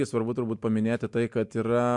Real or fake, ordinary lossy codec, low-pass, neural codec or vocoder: real; MP3, 64 kbps; 10.8 kHz; none